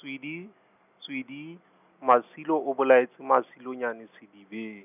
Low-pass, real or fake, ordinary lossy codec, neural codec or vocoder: 3.6 kHz; real; none; none